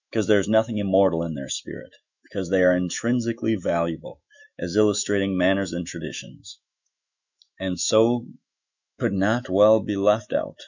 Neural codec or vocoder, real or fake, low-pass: autoencoder, 48 kHz, 128 numbers a frame, DAC-VAE, trained on Japanese speech; fake; 7.2 kHz